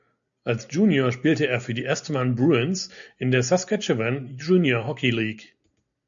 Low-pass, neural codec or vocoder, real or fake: 7.2 kHz; none; real